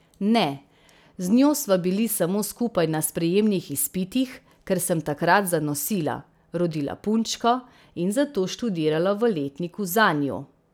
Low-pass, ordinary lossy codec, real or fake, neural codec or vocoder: none; none; real; none